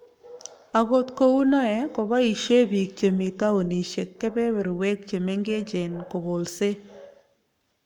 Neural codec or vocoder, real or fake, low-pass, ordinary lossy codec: codec, 44.1 kHz, 7.8 kbps, Pupu-Codec; fake; 19.8 kHz; none